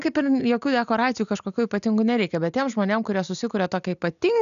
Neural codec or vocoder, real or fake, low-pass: none; real; 7.2 kHz